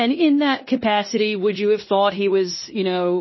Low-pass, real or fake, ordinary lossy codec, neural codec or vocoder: 7.2 kHz; fake; MP3, 24 kbps; codec, 16 kHz in and 24 kHz out, 0.9 kbps, LongCat-Audio-Codec, fine tuned four codebook decoder